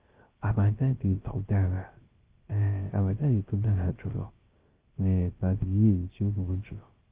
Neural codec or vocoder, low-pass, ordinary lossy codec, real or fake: codec, 16 kHz, 0.3 kbps, FocalCodec; 3.6 kHz; Opus, 16 kbps; fake